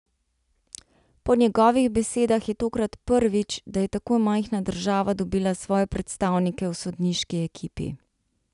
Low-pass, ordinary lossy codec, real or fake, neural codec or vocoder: 10.8 kHz; none; real; none